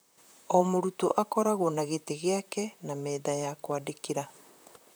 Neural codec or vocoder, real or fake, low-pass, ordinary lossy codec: vocoder, 44.1 kHz, 128 mel bands, Pupu-Vocoder; fake; none; none